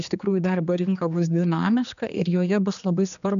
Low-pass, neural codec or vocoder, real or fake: 7.2 kHz; codec, 16 kHz, 2 kbps, X-Codec, HuBERT features, trained on general audio; fake